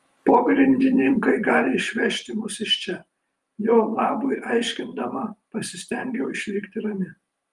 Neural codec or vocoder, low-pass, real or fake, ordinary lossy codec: vocoder, 44.1 kHz, 128 mel bands every 512 samples, BigVGAN v2; 10.8 kHz; fake; Opus, 32 kbps